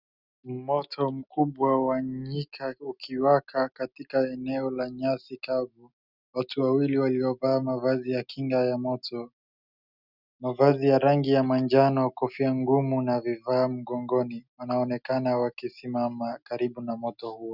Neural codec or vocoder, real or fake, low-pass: none; real; 5.4 kHz